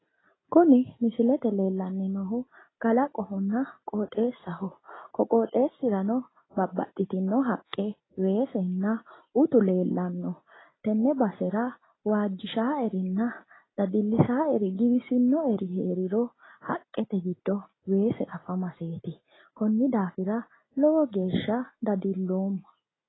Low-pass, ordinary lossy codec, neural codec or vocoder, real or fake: 7.2 kHz; AAC, 16 kbps; none; real